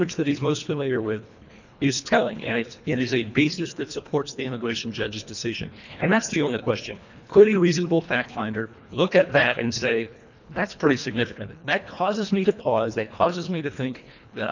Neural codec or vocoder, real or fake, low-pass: codec, 24 kHz, 1.5 kbps, HILCodec; fake; 7.2 kHz